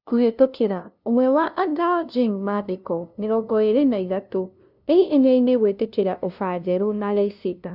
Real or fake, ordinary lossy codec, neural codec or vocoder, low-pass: fake; none; codec, 16 kHz, 0.5 kbps, FunCodec, trained on LibriTTS, 25 frames a second; 5.4 kHz